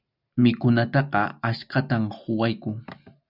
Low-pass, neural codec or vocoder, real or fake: 5.4 kHz; none; real